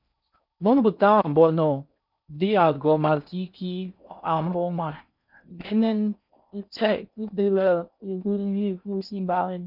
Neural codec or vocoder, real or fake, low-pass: codec, 16 kHz in and 24 kHz out, 0.6 kbps, FocalCodec, streaming, 4096 codes; fake; 5.4 kHz